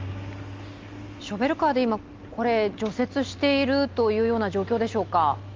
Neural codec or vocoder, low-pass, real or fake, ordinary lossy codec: none; 7.2 kHz; real; Opus, 32 kbps